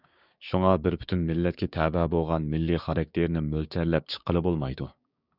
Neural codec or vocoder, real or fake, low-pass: codec, 16 kHz, 6 kbps, DAC; fake; 5.4 kHz